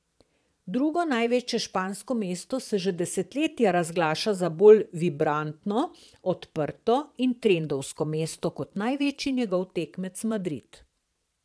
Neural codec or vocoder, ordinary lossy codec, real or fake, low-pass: vocoder, 22.05 kHz, 80 mel bands, Vocos; none; fake; none